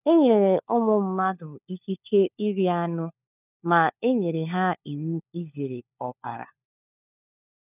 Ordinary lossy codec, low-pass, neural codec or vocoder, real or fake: none; 3.6 kHz; codec, 16 kHz, 4 kbps, FunCodec, trained on LibriTTS, 50 frames a second; fake